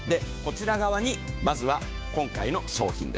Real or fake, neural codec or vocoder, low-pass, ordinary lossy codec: fake; codec, 16 kHz, 6 kbps, DAC; none; none